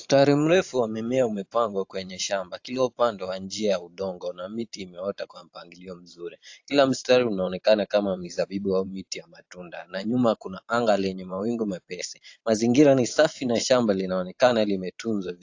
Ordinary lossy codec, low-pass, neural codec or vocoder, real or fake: AAC, 48 kbps; 7.2 kHz; vocoder, 44.1 kHz, 128 mel bands every 512 samples, BigVGAN v2; fake